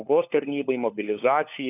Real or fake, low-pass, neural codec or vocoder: fake; 3.6 kHz; codec, 16 kHz, 4 kbps, FunCodec, trained on LibriTTS, 50 frames a second